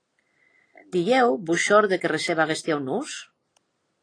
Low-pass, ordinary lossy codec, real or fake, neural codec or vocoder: 9.9 kHz; AAC, 32 kbps; real; none